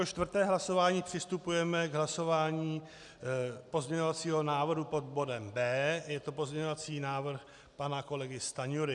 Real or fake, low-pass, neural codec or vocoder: real; 10.8 kHz; none